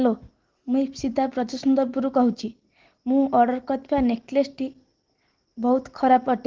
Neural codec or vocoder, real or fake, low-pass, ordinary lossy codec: none; real; 7.2 kHz; Opus, 16 kbps